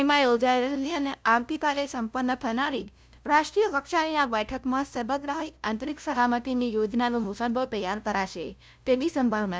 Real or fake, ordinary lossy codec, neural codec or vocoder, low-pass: fake; none; codec, 16 kHz, 0.5 kbps, FunCodec, trained on LibriTTS, 25 frames a second; none